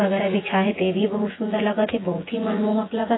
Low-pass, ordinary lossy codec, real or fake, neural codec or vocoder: 7.2 kHz; AAC, 16 kbps; fake; vocoder, 24 kHz, 100 mel bands, Vocos